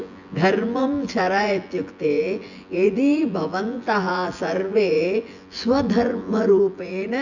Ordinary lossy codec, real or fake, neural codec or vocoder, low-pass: none; fake; vocoder, 24 kHz, 100 mel bands, Vocos; 7.2 kHz